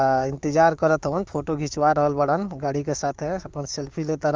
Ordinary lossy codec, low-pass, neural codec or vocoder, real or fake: Opus, 32 kbps; 7.2 kHz; codec, 16 kHz, 6 kbps, DAC; fake